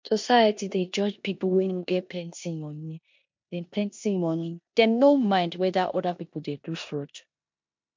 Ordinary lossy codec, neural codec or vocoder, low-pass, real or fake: MP3, 48 kbps; codec, 16 kHz in and 24 kHz out, 0.9 kbps, LongCat-Audio-Codec, four codebook decoder; 7.2 kHz; fake